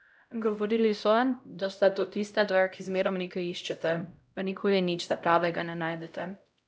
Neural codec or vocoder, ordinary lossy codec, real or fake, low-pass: codec, 16 kHz, 0.5 kbps, X-Codec, HuBERT features, trained on LibriSpeech; none; fake; none